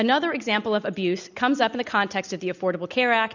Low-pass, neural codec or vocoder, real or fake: 7.2 kHz; none; real